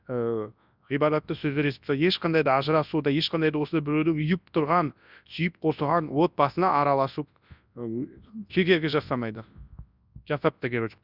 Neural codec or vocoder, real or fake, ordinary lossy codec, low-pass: codec, 24 kHz, 0.9 kbps, WavTokenizer, large speech release; fake; none; 5.4 kHz